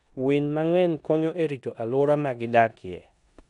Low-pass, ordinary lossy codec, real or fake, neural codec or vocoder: 10.8 kHz; none; fake; codec, 16 kHz in and 24 kHz out, 0.9 kbps, LongCat-Audio-Codec, four codebook decoder